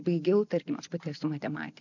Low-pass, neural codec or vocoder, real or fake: 7.2 kHz; none; real